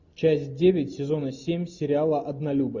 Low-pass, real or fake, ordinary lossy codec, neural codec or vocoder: 7.2 kHz; real; MP3, 64 kbps; none